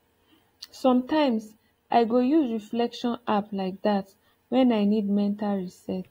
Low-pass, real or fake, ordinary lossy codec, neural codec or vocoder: 19.8 kHz; real; AAC, 48 kbps; none